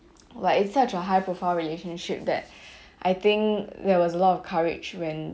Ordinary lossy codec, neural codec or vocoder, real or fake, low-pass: none; none; real; none